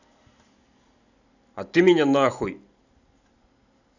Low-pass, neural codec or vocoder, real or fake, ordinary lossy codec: 7.2 kHz; none; real; none